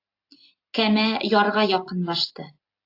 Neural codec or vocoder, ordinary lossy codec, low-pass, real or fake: none; AAC, 32 kbps; 5.4 kHz; real